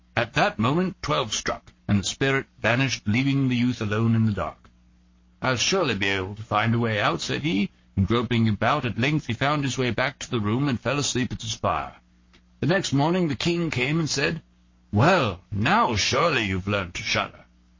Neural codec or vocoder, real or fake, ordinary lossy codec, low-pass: codec, 44.1 kHz, 7.8 kbps, Pupu-Codec; fake; MP3, 32 kbps; 7.2 kHz